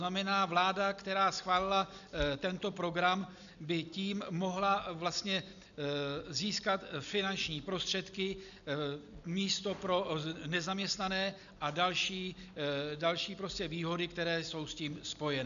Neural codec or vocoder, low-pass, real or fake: none; 7.2 kHz; real